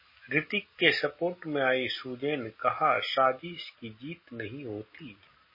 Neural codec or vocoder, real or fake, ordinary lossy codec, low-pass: none; real; MP3, 24 kbps; 5.4 kHz